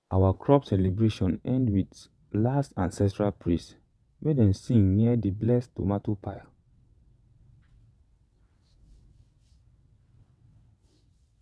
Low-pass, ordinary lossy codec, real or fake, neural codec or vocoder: none; none; fake; vocoder, 22.05 kHz, 80 mel bands, WaveNeXt